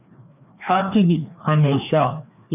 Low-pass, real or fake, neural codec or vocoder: 3.6 kHz; fake; codec, 16 kHz, 2 kbps, FreqCodec, larger model